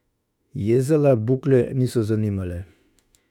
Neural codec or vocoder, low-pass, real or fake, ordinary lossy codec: autoencoder, 48 kHz, 32 numbers a frame, DAC-VAE, trained on Japanese speech; 19.8 kHz; fake; none